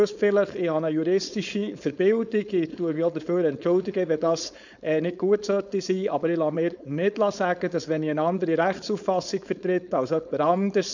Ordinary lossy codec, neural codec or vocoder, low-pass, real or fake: none; codec, 16 kHz, 4.8 kbps, FACodec; 7.2 kHz; fake